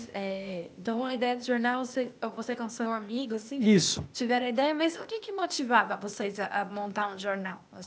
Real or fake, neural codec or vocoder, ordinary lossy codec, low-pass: fake; codec, 16 kHz, 0.8 kbps, ZipCodec; none; none